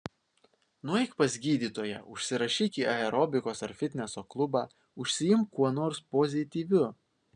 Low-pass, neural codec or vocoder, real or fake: 9.9 kHz; none; real